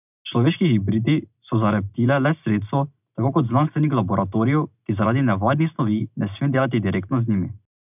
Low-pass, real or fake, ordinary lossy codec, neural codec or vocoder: 3.6 kHz; real; none; none